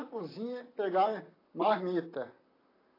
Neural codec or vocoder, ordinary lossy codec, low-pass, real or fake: vocoder, 44.1 kHz, 128 mel bands, Pupu-Vocoder; AAC, 24 kbps; 5.4 kHz; fake